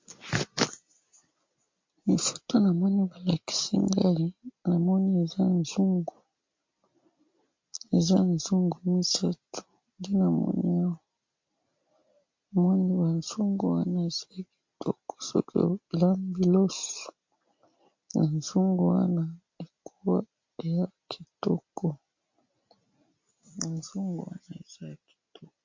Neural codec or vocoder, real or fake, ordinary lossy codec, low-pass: none; real; MP3, 48 kbps; 7.2 kHz